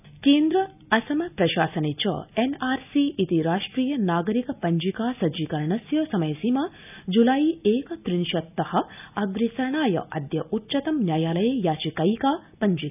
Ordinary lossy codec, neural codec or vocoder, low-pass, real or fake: none; none; 3.6 kHz; real